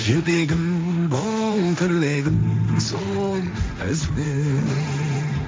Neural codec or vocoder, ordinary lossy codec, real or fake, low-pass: codec, 16 kHz, 1.1 kbps, Voila-Tokenizer; none; fake; none